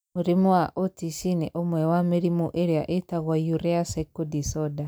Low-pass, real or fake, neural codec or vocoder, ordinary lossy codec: none; real; none; none